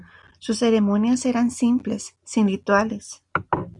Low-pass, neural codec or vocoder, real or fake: 10.8 kHz; none; real